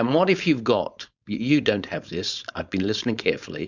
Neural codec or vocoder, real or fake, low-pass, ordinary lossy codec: codec, 16 kHz, 4.8 kbps, FACodec; fake; 7.2 kHz; Opus, 64 kbps